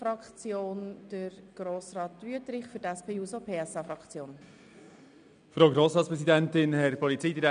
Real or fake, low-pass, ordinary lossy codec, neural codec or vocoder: real; 9.9 kHz; none; none